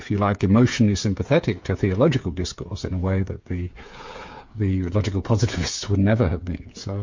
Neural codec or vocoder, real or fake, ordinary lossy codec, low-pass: codec, 16 kHz, 8 kbps, FreqCodec, smaller model; fake; MP3, 48 kbps; 7.2 kHz